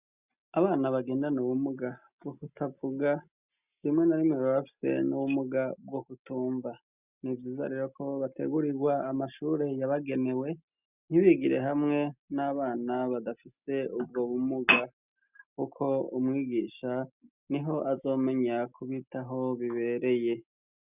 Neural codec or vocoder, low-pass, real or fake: none; 3.6 kHz; real